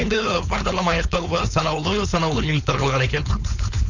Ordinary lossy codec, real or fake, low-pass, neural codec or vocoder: none; fake; 7.2 kHz; codec, 16 kHz, 4.8 kbps, FACodec